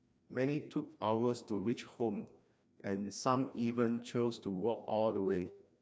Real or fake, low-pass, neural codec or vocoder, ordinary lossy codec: fake; none; codec, 16 kHz, 1 kbps, FreqCodec, larger model; none